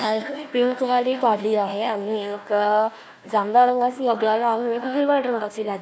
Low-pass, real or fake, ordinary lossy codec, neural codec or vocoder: none; fake; none; codec, 16 kHz, 1 kbps, FunCodec, trained on Chinese and English, 50 frames a second